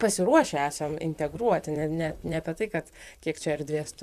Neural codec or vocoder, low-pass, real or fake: vocoder, 44.1 kHz, 128 mel bands, Pupu-Vocoder; 14.4 kHz; fake